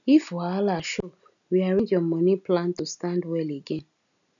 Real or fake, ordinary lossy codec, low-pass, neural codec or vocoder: real; none; 7.2 kHz; none